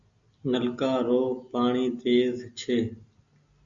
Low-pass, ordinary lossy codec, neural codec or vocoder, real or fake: 7.2 kHz; Opus, 64 kbps; none; real